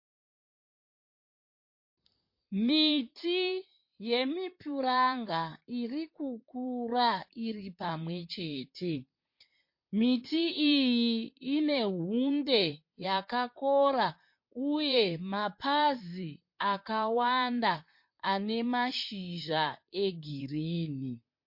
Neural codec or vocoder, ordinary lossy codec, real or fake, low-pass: vocoder, 44.1 kHz, 128 mel bands, Pupu-Vocoder; MP3, 32 kbps; fake; 5.4 kHz